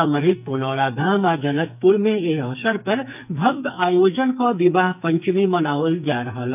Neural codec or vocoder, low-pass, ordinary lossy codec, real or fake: codec, 44.1 kHz, 2.6 kbps, SNAC; 3.6 kHz; none; fake